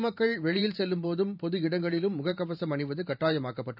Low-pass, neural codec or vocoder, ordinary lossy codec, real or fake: 5.4 kHz; vocoder, 44.1 kHz, 128 mel bands every 512 samples, BigVGAN v2; none; fake